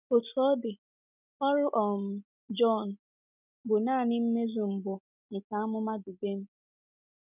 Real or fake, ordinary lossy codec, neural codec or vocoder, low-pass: real; none; none; 3.6 kHz